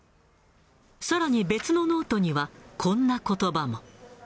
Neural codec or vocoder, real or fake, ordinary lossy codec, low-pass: none; real; none; none